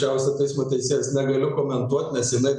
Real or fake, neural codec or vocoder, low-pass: real; none; 10.8 kHz